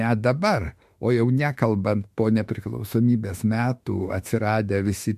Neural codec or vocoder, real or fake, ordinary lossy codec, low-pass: autoencoder, 48 kHz, 32 numbers a frame, DAC-VAE, trained on Japanese speech; fake; MP3, 64 kbps; 14.4 kHz